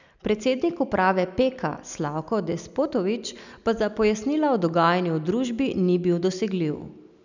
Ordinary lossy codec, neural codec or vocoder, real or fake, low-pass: none; none; real; 7.2 kHz